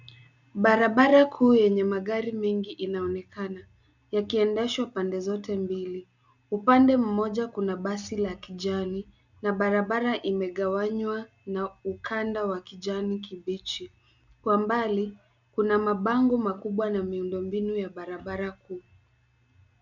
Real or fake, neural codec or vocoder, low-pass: real; none; 7.2 kHz